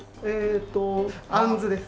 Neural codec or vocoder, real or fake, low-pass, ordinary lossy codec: none; real; none; none